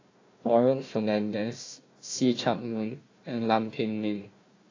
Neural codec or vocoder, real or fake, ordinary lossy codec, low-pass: codec, 16 kHz, 1 kbps, FunCodec, trained on Chinese and English, 50 frames a second; fake; AAC, 32 kbps; 7.2 kHz